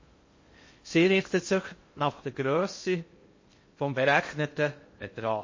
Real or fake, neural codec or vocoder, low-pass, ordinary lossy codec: fake; codec, 16 kHz in and 24 kHz out, 0.6 kbps, FocalCodec, streaming, 2048 codes; 7.2 kHz; MP3, 32 kbps